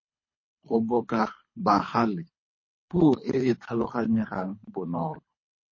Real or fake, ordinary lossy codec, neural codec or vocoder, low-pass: fake; MP3, 32 kbps; codec, 24 kHz, 3 kbps, HILCodec; 7.2 kHz